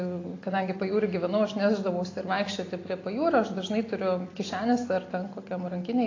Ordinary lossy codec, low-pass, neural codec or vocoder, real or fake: AAC, 32 kbps; 7.2 kHz; none; real